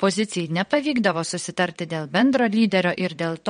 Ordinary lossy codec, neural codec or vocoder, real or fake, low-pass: MP3, 48 kbps; vocoder, 22.05 kHz, 80 mel bands, WaveNeXt; fake; 9.9 kHz